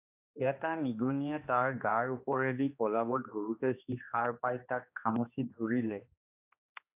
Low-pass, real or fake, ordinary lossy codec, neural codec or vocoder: 3.6 kHz; fake; AAC, 32 kbps; codec, 16 kHz, 2 kbps, X-Codec, HuBERT features, trained on general audio